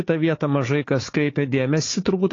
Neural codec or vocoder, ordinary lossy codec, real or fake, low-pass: codec, 16 kHz, 4 kbps, FunCodec, trained on Chinese and English, 50 frames a second; AAC, 32 kbps; fake; 7.2 kHz